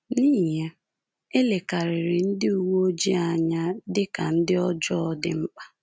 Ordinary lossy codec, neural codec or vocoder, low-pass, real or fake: none; none; none; real